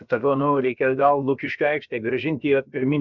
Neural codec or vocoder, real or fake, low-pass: codec, 16 kHz, about 1 kbps, DyCAST, with the encoder's durations; fake; 7.2 kHz